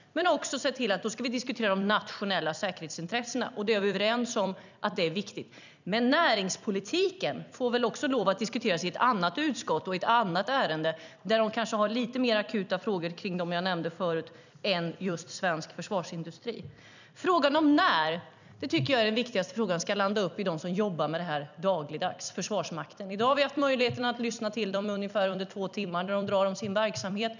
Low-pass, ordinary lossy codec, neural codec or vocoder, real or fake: 7.2 kHz; none; vocoder, 44.1 kHz, 128 mel bands every 256 samples, BigVGAN v2; fake